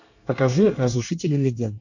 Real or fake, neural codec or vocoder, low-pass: fake; codec, 24 kHz, 1 kbps, SNAC; 7.2 kHz